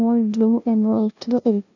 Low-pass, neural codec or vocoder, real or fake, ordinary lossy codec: 7.2 kHz; codec, 16 kHz, 0.5 kbps, FunCodec, trained on LibriTTS, 25 frames a second; fake; none